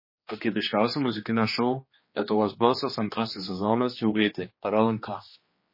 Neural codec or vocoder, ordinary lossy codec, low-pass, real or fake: codec, 16 kHz, 2 kbps, X-Codec, HuBERT features, trained on general audio; MP3, 24 kbps; 5.4 kHz; fake